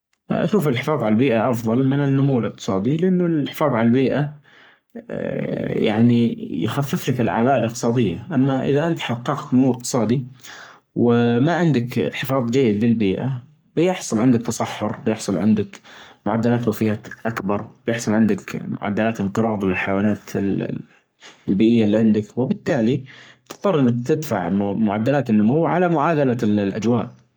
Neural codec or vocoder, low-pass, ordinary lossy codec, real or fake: codec, 44.1 kHz, 3.4 kbps, Pupu-Codec; none; none; fake